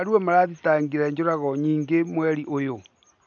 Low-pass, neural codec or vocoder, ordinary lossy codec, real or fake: 7.2 kHz; none; MP3, 64 kbps; real